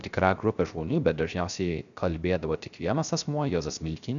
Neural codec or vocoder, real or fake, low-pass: codec, 16 kHz, 0.3 kbps, FocalCodec; fake; 7.2 kHz